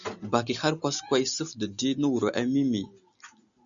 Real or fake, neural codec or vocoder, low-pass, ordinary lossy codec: real; none; 7.2 kHz; MP3, 96 kbps